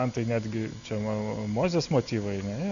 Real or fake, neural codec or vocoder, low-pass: real; none; 7.2 kHz